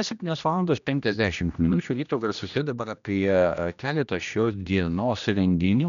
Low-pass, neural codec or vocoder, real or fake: 7.2 kHz; codec, 16 kHz, 1 kbps, X-Codec, HuBERT features, trained on general audio; fake